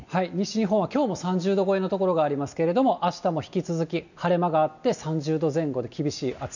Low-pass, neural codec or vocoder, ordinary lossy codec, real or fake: 7.2 kHz; none; none; real